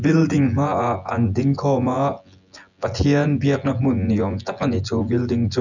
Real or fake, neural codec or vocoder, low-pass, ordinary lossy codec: fake; vocoder, 24 kHz, 100 mel bands, Vocos; 7.2 kHz; none